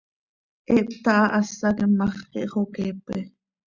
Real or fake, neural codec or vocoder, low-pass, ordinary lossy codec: real; none; 7.2 kHz; Opus, 64 kbps